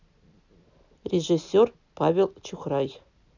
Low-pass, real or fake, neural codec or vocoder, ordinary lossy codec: 7.2 kHz; real; none; none